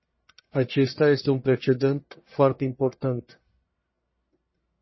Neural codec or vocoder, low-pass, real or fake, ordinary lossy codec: codec, 44.1 kHz, 1.7 kbps, Pupu-Codec; 7.2 kHz; fake; MP3, 24 kbps